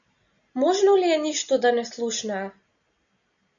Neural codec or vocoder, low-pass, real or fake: none; 7.2 kHz; real